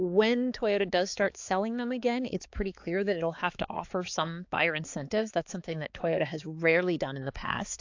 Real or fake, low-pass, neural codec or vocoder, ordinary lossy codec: fake; 7.2 kHz; codec, 16 kHz, 4 kbps, X-Codec, HuBERT features, trained on balanced general audio; AAC, 48 kbps